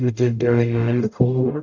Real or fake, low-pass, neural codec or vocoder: fake; 7.2 kHz; codec, 44.1 kHz, 0.9 kbps, DAC